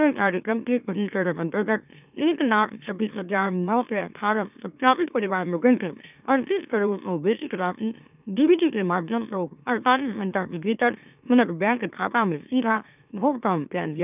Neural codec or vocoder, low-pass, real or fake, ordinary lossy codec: autoencoder, 44.1 kHz, a latent of 192 numbers a frame, MeloTTS; 3.6 kHz; fake; none